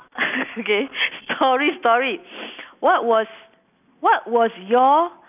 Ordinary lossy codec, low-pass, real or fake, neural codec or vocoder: none; 3.6 kHz; real; none